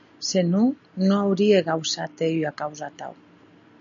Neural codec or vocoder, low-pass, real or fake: none; 7.2 kHz; real